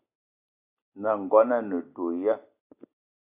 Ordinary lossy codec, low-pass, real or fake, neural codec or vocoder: AAC, 32 kbps; 3.6 kHz; real; none